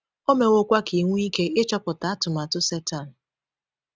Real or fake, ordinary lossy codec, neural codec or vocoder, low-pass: real; none; none; none